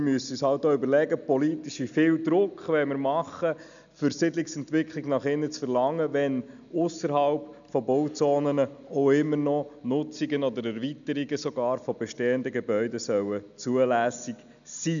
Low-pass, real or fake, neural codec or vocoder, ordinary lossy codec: 7.2 kHz; real; none; none